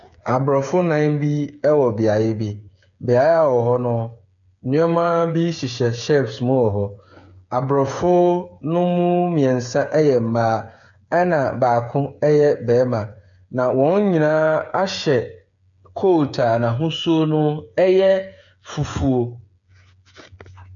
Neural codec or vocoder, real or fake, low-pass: codec, 16 kHz, 8 kbps, FreqCodec, smaller model; fake; 7.2 kHz